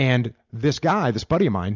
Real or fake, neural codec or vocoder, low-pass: real; none; 7.2 kHz